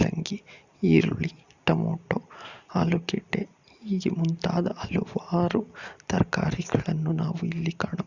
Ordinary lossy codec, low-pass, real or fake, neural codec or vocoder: Opus, 64 kbps; 7.2 kHz; real; none